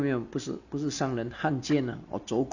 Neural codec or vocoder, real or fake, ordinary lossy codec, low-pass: none; real; none; 7.2 kHz